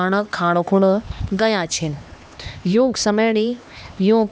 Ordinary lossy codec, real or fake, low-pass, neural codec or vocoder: none; fake; none; codec, 16 kHz, 2 kbps, X-Codec, HuBERT features, trained on LibriSpeech